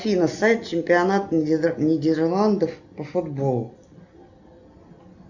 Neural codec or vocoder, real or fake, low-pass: none; real; 7.2 kHz